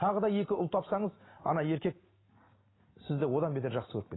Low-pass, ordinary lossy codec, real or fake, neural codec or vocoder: 7.2 kHz; AAC, 16 kbps; real; none